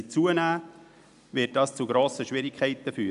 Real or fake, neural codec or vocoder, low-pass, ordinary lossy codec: real; none; 10.8 kHz; none